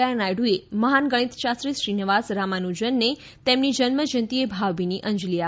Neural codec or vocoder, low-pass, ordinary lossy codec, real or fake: none; none; none; real